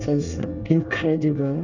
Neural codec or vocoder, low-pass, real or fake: codec, 24 kHz, 1 kbps, SNAC; 7.2 kHz; fake